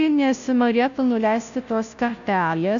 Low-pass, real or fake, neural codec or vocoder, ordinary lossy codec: 7.2 kHz; fake; codec, 16 kHz, 0.5 kbps, FunCodec, trained on Chinese and English, 25 frames a second; MP3, 96 kbps